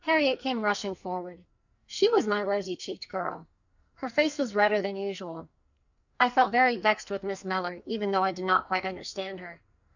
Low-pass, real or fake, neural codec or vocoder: 7.2 kHz; fake; codec, 44.1 kHz, 2.6 kbps, SNAC